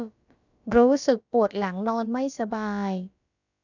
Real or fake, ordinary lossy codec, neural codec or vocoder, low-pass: fake; none; codec, 16 kHz, about 1 kbps, DyCAST, with the encoder's durations; 7.2 kHz